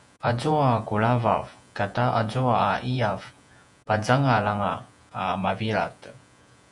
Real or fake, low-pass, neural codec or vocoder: fake; 10.8 kHz; vocoder, 48 kHz, 128 mel bands, Vocos